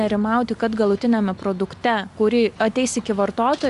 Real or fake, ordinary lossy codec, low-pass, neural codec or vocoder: real; Opus, 64 kbps; 10.8 kHz; none